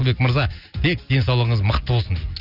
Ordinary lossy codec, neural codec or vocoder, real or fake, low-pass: none; none; real; 5.4 kHz